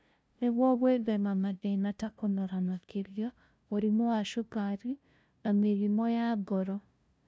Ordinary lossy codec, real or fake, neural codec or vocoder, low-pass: none; fake; codec, 16 kHz, 0.5 kbps, FunCodec, trained on LibriTTS, 25 frames a second; none